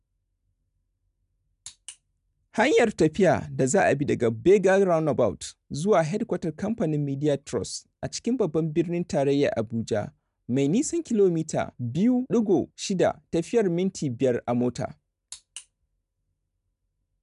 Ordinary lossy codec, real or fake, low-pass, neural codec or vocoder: none; real; 10.8 kHz; none